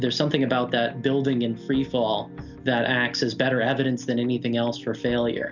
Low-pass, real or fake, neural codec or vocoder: 7.2 kHz; real; none